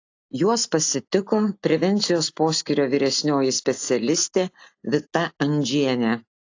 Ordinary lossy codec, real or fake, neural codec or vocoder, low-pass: AAC, 48 kbps; real; none; 7.2 kHz